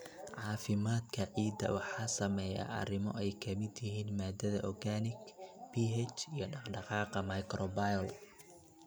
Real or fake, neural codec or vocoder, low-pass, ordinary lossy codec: real; none; none; none